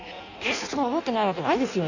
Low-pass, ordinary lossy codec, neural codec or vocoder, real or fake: 7.2 kHz; none; codec, 16 kHz in and 24 kHz out, 0.6 kbps, FireRedTTS-2 codec; fake